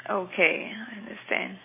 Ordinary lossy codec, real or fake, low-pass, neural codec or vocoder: MP3, 16 kbps; real; 3.6 kHz; none